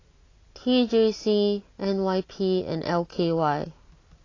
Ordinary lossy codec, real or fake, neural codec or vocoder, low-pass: AAC, 32 kbps; real; none; 7.2 kHz